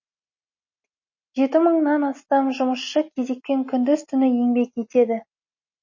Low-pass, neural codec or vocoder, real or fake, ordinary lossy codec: 7.2 kHz; none; real; MP3, 32 kbps